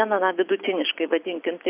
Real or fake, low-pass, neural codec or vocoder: real; 3.6 kHz; none